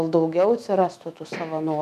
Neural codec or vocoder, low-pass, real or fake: autoencoder, 48 kHz, 128 numbers a frame, DAC-VAE, trained on Japanese speech; 14.4 kHz; fake